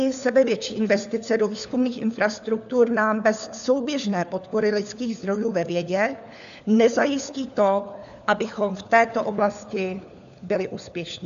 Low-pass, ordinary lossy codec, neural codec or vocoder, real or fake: 7.2 kHz; AAC, 96 kbps; codec, 16 kHz, 4 kbps, FunCodec, trained on LibriTTS, 50 frames a second; fake